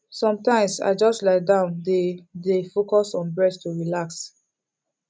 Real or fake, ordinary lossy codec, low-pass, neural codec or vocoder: real; none; none; none